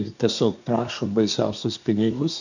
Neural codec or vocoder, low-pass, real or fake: codec, 24 kHz, 1 kbps, SNAC; 7.2 kHz; fake